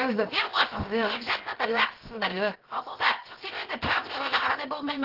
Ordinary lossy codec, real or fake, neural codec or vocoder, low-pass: Opus, 16 kbps; fake; codec, 16 kHz, 0.7 kbps, FocalCodec; 5.4 kHz